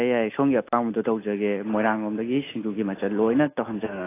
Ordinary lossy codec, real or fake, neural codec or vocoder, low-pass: AAC, 16 kbps; real; none; 3.6 kHz